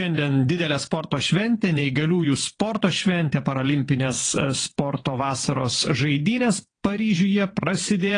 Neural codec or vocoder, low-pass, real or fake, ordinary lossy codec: vocoder, 22.05 kHz, 80 mel bands, WaveNeXt; 9.9 kHz; fake; AAC, 32 kbps